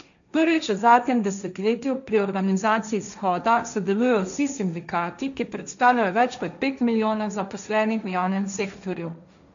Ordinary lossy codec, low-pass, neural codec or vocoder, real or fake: none; 7.2 kHz; codec, 16 kHz, 1.1 kbps, Voila-Tokenizer; fake